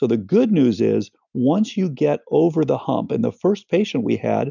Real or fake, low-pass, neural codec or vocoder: real; 7.2 kHz; none